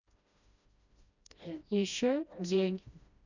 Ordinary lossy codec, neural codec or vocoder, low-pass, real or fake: none; codec, 16 kHz, 1 kbps, FreqCodec, smaller model; 7.2 kHz; fake